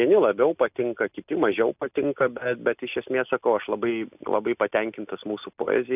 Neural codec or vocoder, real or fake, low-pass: none; real; 3.6 kHz